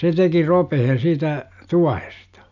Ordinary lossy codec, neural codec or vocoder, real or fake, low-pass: none; none; real; 7.2 kHz